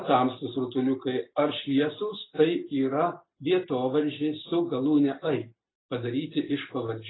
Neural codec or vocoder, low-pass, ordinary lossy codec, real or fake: none; 7.2 kHz; AAC, 16 kbps; real